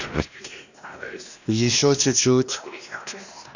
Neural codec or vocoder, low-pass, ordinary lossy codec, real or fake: codec, 16 kHz, 1 kbps, X-Codec, WavLM features, trained on Multilingual LibriSpeech; 7.2 kHz; none; fake